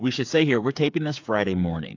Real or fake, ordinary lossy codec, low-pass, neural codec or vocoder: fake; AAC, 48 kbps; 7.2 kHz; codec, 16 kHz, 8 kbps, FreqCodec, smaller model